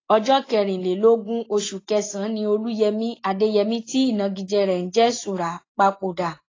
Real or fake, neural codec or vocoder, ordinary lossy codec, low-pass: real; none; AAC, 32 kbps; 7.2 kHz